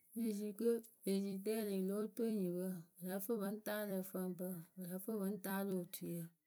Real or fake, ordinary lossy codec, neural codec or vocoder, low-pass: fake; none; vocoder, 44.1 kHz, 128 mel bands every 256 samples, BigVGAN v2; none